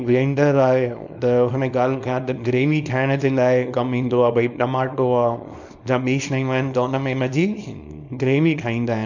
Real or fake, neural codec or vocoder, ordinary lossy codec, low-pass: fake; codec, 24 kHz, 0.9 kbps, WavTokenizer, small release; none; 7.2 kHz